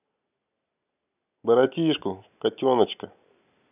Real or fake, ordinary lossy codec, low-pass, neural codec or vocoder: real; none; 3.6 kHz; none